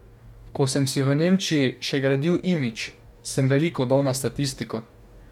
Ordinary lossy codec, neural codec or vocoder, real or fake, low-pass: MP3, 96 kbps; codec, 44.1 kHz, 2.6 kbps, DAC; fake; 19.8 kHz